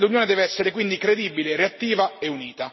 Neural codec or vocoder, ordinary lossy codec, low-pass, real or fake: none; MP3, 24 kbps; 7.2 kHz; real